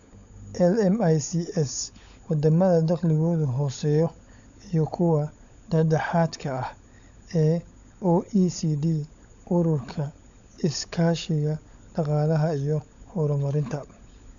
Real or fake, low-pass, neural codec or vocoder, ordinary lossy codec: fake; 7.2 kHz; codec, 16 kHz, 16 kbps, FunCodec, trained on LibriTTS, 50 frames a second; none